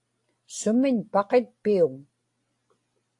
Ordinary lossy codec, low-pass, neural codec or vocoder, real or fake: AAC, 48 kbps; 10.8 kHz; none; real